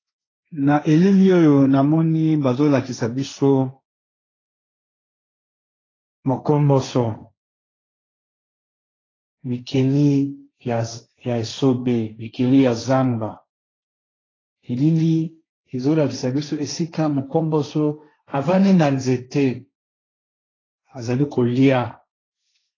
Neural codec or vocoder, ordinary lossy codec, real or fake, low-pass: codec, 16 kHz, 1.1 kbps, Voila-Tokenizer; AAC, 32 kbps; fake; 7.2 kHz